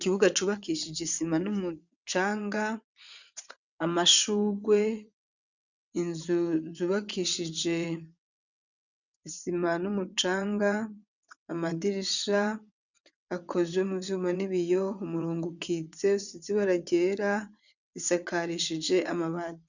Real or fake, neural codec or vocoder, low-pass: fake; vocoder, 22.05 kHz, 80 mel bands, WaveNeXt; 7.2 kHz